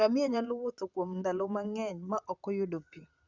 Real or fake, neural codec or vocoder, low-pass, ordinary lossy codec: fake; vocoder, 44.1 kHz, 128 mel bands, Pupu-Vocoder; 7.2 kHz; Opus, 64 kbps